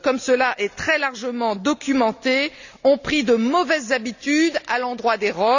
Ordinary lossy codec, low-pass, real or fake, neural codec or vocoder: none; 7.2 kHz; real; none